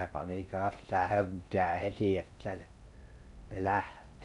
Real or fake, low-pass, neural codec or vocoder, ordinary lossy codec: fake; 10.8 kHz; codec, 16 kHz in and 24 kHz out, 0.6 kbps, FocalCodec, streaming, 4096 codes; none